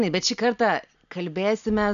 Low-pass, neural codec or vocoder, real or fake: 7.2 kHz; none; real